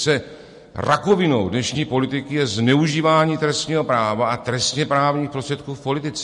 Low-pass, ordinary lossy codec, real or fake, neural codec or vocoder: 14.4 kHz; MP3, 48 kbps; real; none